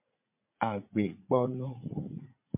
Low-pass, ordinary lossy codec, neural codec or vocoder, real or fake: 3.6 kHz; MP3, 32 kbps; vocoder, 44.1 kHz, 80 mel bands, Vocos; fake